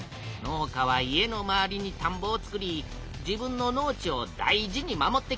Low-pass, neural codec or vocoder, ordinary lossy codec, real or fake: none; none; none; real